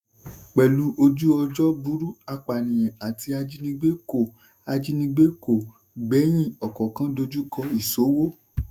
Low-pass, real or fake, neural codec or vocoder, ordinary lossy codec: 19.8 kHz; fake; autoencoder, 48 kHz, 128 numbers a frame, DAC-VAE, trained on Japanese speech; none